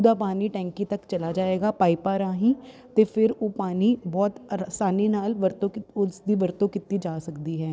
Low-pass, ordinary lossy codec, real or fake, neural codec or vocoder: none; none; real; none